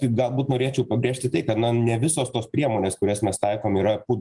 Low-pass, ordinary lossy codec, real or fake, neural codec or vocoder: 10.8 kHz; Opus, 32 kbps; real; none